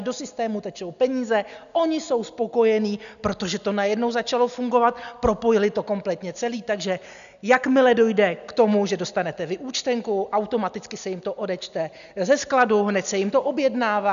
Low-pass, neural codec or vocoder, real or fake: 7.2 kHz; none; real